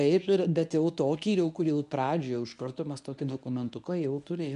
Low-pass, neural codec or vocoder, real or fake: 10.8 kHz; codec, 24 kHz, 0.9 kbps, WavTokenizer, medium speech release version 1; fake